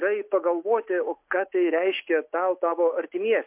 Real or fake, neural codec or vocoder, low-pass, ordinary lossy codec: real; none; 3.6 kHz; MP3, 32 kbps